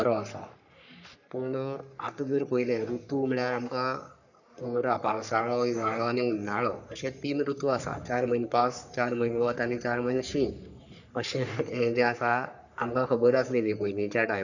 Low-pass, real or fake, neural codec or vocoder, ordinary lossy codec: 7.2 kHz; fake; codec, 44.1 kHz, 3.4 kbps, Pupu-Codec; none